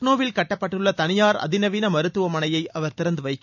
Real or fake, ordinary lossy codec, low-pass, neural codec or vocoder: real; none; 7.2 kHz; none